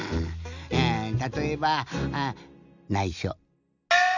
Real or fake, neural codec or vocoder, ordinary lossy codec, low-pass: real; none; none; 7.2 kHz